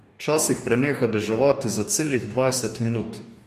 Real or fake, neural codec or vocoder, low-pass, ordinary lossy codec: fake; codec, 44.1 kHz, 2.6 kbps, DAC; 14.4 kHz; MP3, 64 kbps